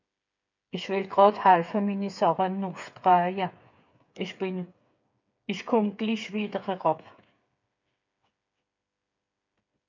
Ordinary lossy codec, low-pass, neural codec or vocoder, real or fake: MP3, 64 kbps; 7.2 kHz; codec, 16 kHz, 8 kbps, FreqCodec, smaller model; fake